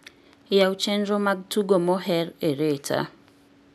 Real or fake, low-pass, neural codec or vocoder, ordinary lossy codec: real; 14.4 kHz; none; none